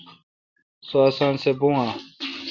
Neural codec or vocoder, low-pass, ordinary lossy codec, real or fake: none; 7.2 kHz; Opus, 64 kbps; real